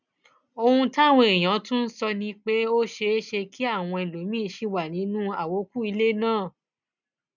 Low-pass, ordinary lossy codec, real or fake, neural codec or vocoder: 7.2 kHz; none; real; none